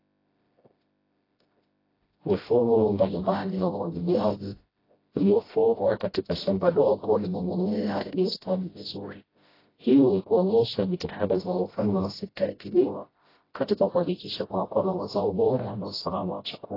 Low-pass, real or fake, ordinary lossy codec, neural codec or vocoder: 5.4 kHz; fake; AAC, 24 kbps; codec, 16 kHz, 0.5 kbps, FreqCodec, smaller model